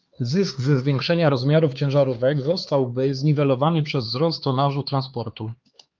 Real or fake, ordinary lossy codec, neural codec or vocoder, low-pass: fake; Opus, 32 kbps; codec, 16 kHz, 4 kbps, X-Codec, HuBERT features, trained on LibriSpeech; 7.2 kHz